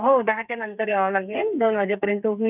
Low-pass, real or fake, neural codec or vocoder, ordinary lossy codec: 3.6 kHz; fake; codec, 32 kHz, 1.9 kbps, SNAC; none